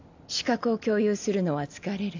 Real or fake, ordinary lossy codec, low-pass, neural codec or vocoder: real; AAC, 48 kbps; 7.2 kHz; none